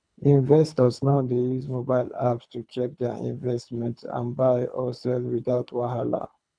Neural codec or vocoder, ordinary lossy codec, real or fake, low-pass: codec, 24 kHz, 3 kbps, HILCodec; none; fake; 9.9 kHz